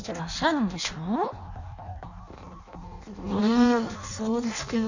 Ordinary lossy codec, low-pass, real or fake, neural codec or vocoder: none; 7.2 kHz; fake; codec, 16 kHz in and 24 kHz out, 0.6 kbps, FireRedTTS-2 codec